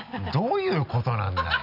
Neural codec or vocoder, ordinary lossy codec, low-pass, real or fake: none; none; 5.4 kHz; real